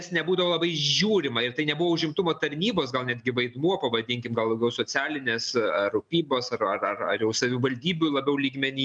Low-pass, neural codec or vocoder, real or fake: 9.9 kHz; none; real